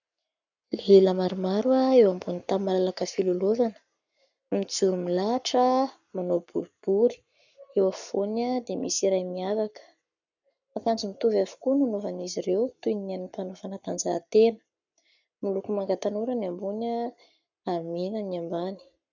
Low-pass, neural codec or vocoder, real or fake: 7.2 kHz; codec, 44.1 kHz, 7.8 kbps, Pupu-Codec; fake